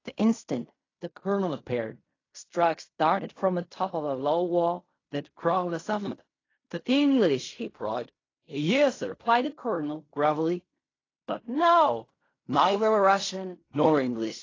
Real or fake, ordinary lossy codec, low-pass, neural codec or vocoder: fake; AAC, 32 kbps; 7.2 kHz; codec, 16 kHz in and 24 kHz out, 0.4 kbps, LongCat-Audio-Codec, fine tuned four codebook decoder